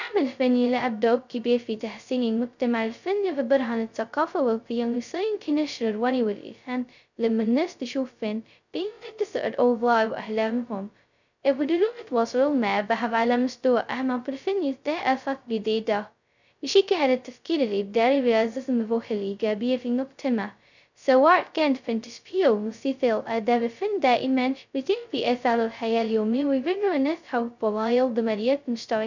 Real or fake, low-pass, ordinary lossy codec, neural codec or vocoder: fake; 7.2 kHz; none; codec, 16 kHz, 0.2 kbps, FocalCodec